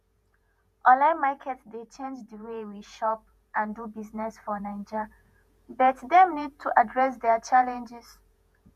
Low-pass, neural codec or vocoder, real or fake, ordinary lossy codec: 14.4 kHz; vocoder, 48 kHz, 128 mel bands, Vocos; fake; none